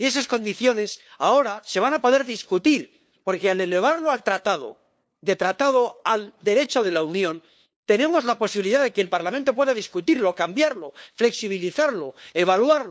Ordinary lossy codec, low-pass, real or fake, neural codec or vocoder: none; none; fake; codec, 16 kHz, 2 kbps, FunCodec, trained on LibriTTS, 25 frames a second